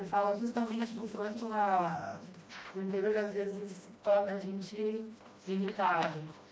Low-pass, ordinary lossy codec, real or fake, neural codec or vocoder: none; none; fake; codec, 16 kHz, 1 kbps, FreqCodec, smaller model